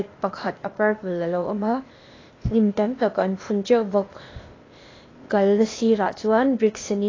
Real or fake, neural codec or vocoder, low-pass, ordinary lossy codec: fake; codec, 16 kHz, 0.8 kbps, ZipCodec; 7.2 kHz; AAC, 32 kbps